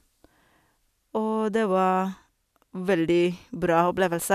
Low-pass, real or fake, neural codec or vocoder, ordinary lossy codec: 14.4 kHz; real; none; none